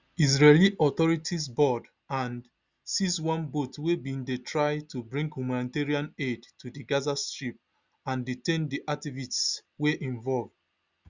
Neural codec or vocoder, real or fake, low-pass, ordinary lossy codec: none; real; 7.2 kHz; Opus, 64 kbps